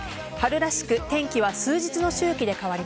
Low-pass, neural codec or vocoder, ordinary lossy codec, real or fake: none; none; none; real